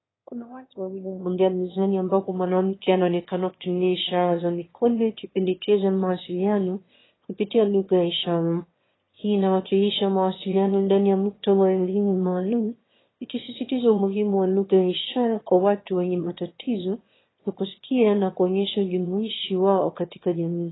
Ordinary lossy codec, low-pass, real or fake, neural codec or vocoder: AAC, 16 kbps; 7.2 kHz; fake; autoencoder, 22.05 kHz, a latent of 192 numbers a frame, VITS, trained on one speaker